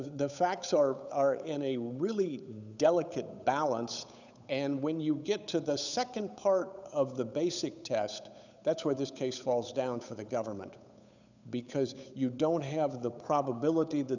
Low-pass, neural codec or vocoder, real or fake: 7.2 kHz; codec, 16 kHz, 8 kbps, FunCodec, trained on Chinese and English, 25 frames a second; fake